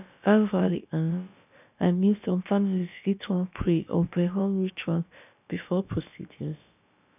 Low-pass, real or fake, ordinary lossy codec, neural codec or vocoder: 3.6 kHz; fake; none; codec, 16 kHz, about 1 kbps, DyCAST, with the encoder's durations